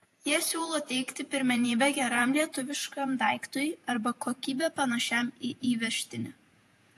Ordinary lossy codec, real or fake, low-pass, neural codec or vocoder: AAC, 64 kbps; fake; 14.4 kHz; vocoder, 48 kHz, 128 mel bands, Vocos